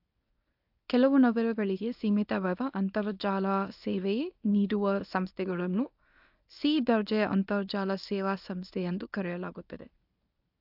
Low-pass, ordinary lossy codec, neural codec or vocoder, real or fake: 5.4 kHz; AAC, 48 kbps; codec, 24 kHz, 0.9 kbps, WavTokenizer, medium speech release version 1; fake